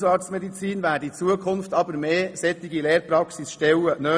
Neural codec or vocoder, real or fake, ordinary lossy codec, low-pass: none; real; none; none